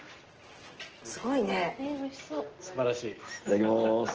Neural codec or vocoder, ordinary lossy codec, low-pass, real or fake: none; Opus, 16 kbps; 7.2 kHz; real